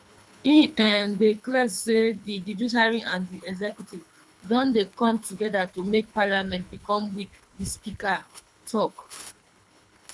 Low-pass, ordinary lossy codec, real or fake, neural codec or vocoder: none; none; fake; codec, 24 kHz, 3 kbps, HILCodec